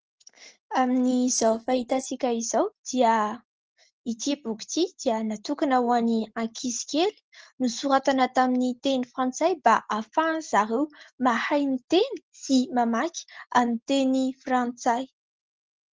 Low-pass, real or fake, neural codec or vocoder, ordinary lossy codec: 7.2 kHz; real; none; Opus, 16 kbps